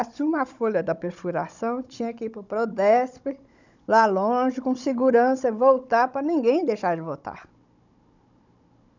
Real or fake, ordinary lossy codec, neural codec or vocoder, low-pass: fake; none; codec, 16 kHz, 16 kbps, FunCodec, trained on Chinese and English, 50 frames a second; 7.2 kHz